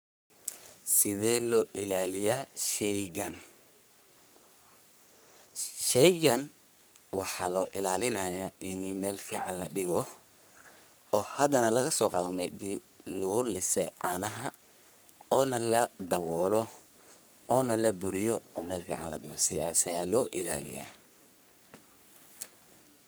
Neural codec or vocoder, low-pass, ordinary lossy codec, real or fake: codec, 44.1 kHz, 3.4 kbps, Pupu-Codec; none; none; fake